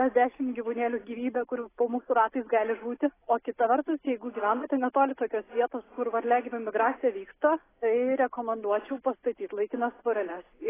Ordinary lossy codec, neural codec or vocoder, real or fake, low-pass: AAC, 16 kbps; none; real; 3.6 kHz